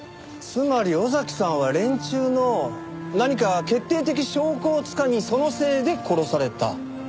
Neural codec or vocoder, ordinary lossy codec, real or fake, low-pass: none; none; real; none